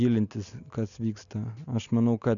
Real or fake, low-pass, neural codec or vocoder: real; 7.2 kHz; none